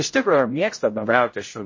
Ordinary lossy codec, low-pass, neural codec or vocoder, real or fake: MP3, 32 kbps; 7.2 kHz; codec, 16 kHz, 0.5 kbps, X-Codec, HuBERT features, trained on general audio; fake